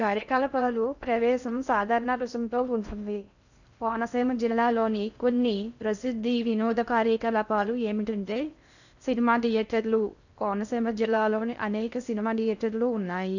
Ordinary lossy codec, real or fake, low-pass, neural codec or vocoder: none; fake; 7.2 kHz; codec, 16 kHz in and 24 kHz out, 0.6 kbps, FocalCodec, streaming, 4096 codes